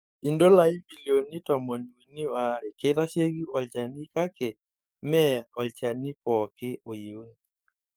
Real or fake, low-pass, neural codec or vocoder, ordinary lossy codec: fake; none; codec, 44.1 kHz, 7.8 kbps, DAC; none